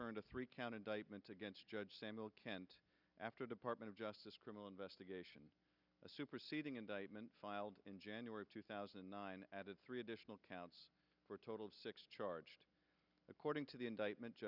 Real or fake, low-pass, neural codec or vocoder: real; 5.4 kHz; none